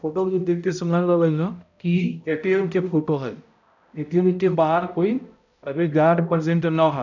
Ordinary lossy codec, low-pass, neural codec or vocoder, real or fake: none; 7.2 kHz; codec, 16 kHz, 0.5 kbps, X-Codec, HuBERT features, trained on balanced general audio; fake